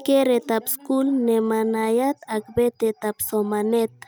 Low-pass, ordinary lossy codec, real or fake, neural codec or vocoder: none; none; real; none